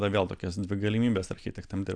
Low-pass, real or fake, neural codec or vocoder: 9.9 kHz; real; none